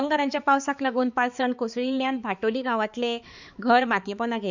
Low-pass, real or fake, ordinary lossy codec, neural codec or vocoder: 7.2 kHz; fake; Opus, 64 kbps; codec, 16 kHz, 4 kbps, X-Codec, HuBERT features, trained on balanced general audio